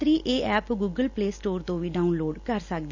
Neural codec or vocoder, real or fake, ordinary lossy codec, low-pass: none; real; none; 7.2 kHz